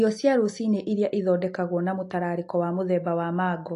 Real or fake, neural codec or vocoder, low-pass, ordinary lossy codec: real; none; 10.8 kHz; AAC, 48 kbps